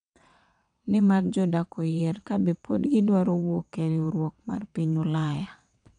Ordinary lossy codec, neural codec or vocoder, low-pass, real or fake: none; vocoder, 22.05 kHz, 80 mel bands, WaveNeXt; 9.9 kHz; fake